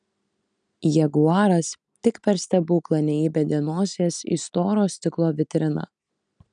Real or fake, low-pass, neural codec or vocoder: real; 9.9 kHz; none